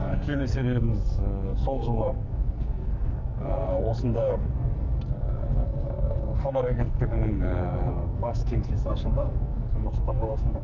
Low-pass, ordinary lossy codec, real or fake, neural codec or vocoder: 7.2 kHz; none; fake; codec, 44.1 kHz, 2.6 kbps, SNAC